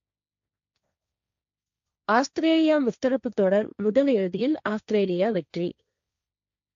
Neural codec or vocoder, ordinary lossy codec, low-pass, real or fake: codec, 16 kHz, 1.1 kbps, Voila-Tokenizer; AAC, 64 kbps; 7.2 kHz; fake